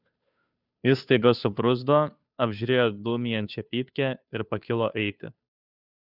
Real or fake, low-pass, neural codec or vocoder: fake; 5.4 kHz; codec, 16 kHz, 2 kbps, FunCodec, trained on Chinese and English, 25 frames a second